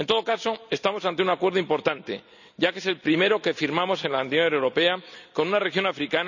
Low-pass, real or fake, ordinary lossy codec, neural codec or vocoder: 7.2 kHz; real; none; none